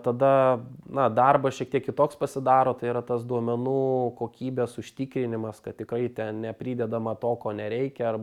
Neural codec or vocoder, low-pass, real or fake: none; 19.8 kHz; real